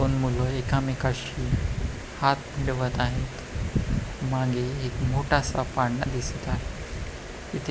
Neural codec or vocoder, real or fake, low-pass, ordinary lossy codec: none; real; none; none